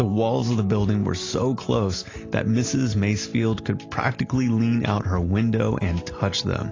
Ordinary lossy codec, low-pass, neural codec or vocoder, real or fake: AAC, 32 kbps; 7.2 kHz; none; real